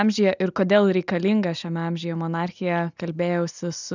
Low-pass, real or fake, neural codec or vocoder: 7.2 kHz; real; none